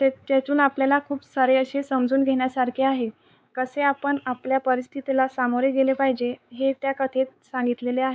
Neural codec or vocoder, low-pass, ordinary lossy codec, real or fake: codec, 16 kHz, 4 kbps, X-Codec, WavLM features, trained on Multilingual LibriSpeech; none; none; fake